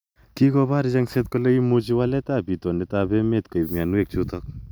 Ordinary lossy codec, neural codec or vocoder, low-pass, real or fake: none; none; none; real